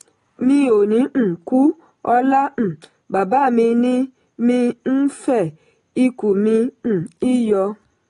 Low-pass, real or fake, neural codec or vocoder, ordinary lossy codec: 10.8 kHz; real; none; AAC, 32 kbps